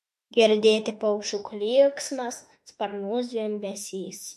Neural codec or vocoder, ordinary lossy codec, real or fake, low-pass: autoencoder, 48 kHz, 32 numbers a frame, DAC-VAE, trained on Japanese speech; MP3, 64 kbps; fake; 14.4 kHz